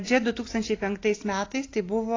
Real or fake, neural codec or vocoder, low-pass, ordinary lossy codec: real; none; 7.2 kHz; AAC, 32 kbps